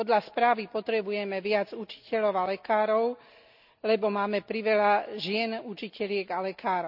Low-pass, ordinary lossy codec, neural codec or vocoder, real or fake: 5.4 kHz; none; none; real